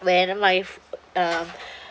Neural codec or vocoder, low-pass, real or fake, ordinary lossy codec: none; none; real; none